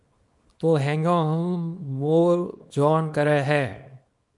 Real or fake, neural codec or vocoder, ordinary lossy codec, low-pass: fake; codec, 24 kHz, 0.9 kbps, WavTokenizer, small release; MP3, 64 kbps; 10.8 kHz